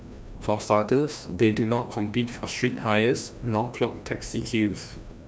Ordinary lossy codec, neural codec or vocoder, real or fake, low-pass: none; codec, 16 kHz, 1 kbps, FreqCodec, larger model; fake; none